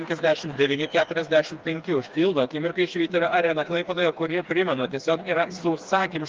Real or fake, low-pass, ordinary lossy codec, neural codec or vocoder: fake; 7.2 kHz; Opus, 24 kbps; codec, 16 kHz, 2 kbps, FreqCodec, smaller model